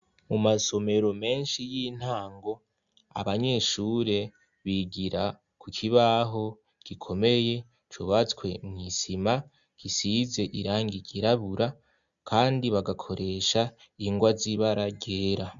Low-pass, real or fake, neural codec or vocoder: 7.2 kHz; real; none